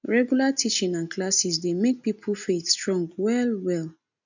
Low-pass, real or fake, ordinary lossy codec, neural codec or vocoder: 7.2 kHz; real; none; none